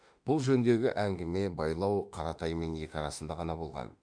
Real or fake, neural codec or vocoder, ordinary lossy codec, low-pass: fake; autoencoder, 48 kHz, 32 numbers a frame, DAC-VAE, trained on Japanese speech; none; 9.9 kHz